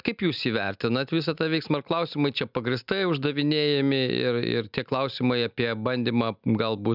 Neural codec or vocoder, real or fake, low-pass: none; real; 5.4 kHz